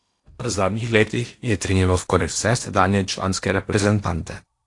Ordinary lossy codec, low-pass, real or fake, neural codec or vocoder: AAC, 48 kbps; 10.8 kHz; fake; codec, 16 kHz in and 24 kHz out, 0.8 kbps, FocalCodec, streaming, 65536 codes